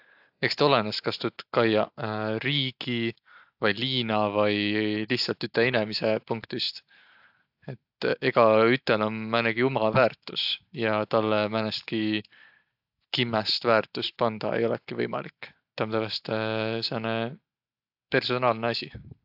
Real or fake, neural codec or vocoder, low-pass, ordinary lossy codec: real; none; 5.4 kHz; AAC, 48 kbps